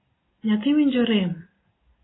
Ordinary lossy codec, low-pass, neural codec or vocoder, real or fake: AAC, 16 kbps; 7.2 kHz; none; real